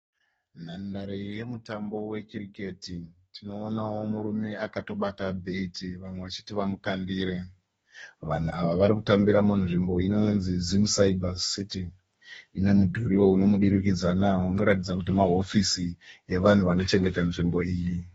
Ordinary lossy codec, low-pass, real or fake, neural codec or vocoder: AAC, 24 kbps; 14.4 kHz; fake; codec, 32 kHz, 1.9 kbps, SNAC